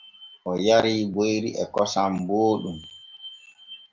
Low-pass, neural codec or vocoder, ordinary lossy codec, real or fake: 7.2 kHz; none; Opus, 32 kbps; real